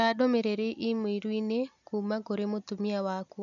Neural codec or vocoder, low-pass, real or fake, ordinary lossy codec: none; 7.2 kHz; real; none